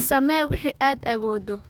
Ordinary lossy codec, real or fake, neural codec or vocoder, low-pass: none; fake; codec, 44.1 kHz, 2.6 kbps, DAC; none